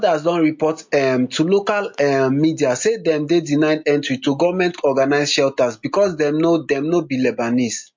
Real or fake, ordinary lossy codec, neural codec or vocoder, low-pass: real; MP3, 48 kbps; none; 7.2 kHz